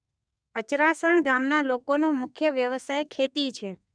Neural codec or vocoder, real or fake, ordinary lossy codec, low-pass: codec, 32 kHz, 1.9 kbps, SNAC; fake; none; 9.9 kHz